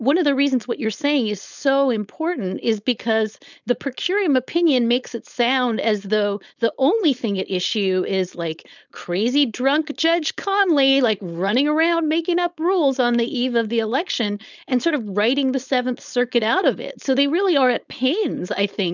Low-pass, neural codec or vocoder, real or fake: 7.2 kHz; codec, 16 kHz, 4.8 kbps, FACodec; fake